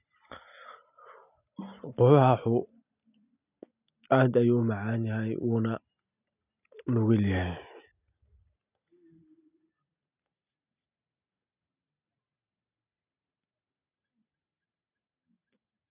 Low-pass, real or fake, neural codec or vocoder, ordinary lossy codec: 3.6 kHz; real; none; none